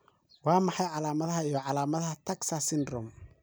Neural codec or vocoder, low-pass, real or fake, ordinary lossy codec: none; none; real; none